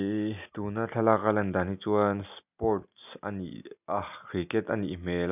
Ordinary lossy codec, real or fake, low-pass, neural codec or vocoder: none; real; 3.6 kHz; none